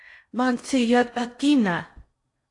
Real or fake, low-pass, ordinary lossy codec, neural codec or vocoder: fake; 10.8 kHz; AAC, 48 kbps; codec, 16 kHz in and 24 kHz out, 0.6 kbps, FocalCodec, streaming, 4096 codes